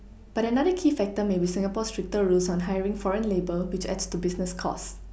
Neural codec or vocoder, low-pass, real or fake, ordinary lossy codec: none; none; real; none